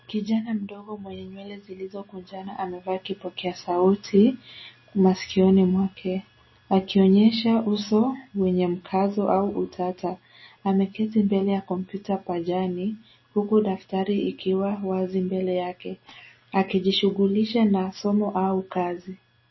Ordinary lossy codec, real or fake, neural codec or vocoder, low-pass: MP3, 24 kbps; real; none; 7.2 kHz